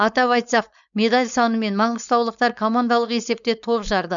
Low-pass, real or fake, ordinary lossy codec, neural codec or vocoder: 7.2 kHz; fake; none; codec, 16 kHz, 4.8 kbps, FACodec